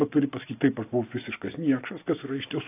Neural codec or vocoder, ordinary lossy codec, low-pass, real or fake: none; AAC, 24 kbps; 3.6 kHz; real